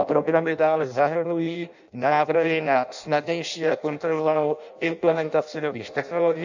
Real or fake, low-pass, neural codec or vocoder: fake; 7.2 kHz; codec, 16 kHz in and 24 kHz out, 0.6 kbps, FireRedTTS-2 codec